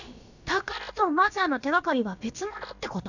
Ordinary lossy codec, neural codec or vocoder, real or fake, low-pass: none; codec, 16 kHz, about 1 kbps, DyCAST, with the encoder's durations; fake; 7.2 kHz